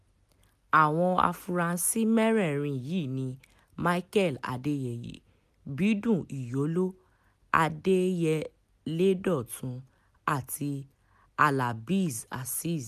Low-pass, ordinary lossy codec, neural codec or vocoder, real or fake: 14.4 kHz; MP3, 96 kbps; none; real